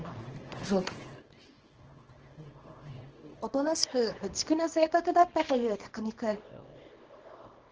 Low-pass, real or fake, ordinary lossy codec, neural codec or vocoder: 7.2 kHz; fake; Opus, 16 kbps; codec, 24 kHz, 0.9 kbps, WavTokenizer, small release